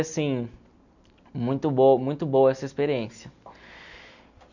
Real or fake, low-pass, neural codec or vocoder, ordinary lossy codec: real; 7.2 kHz; none; none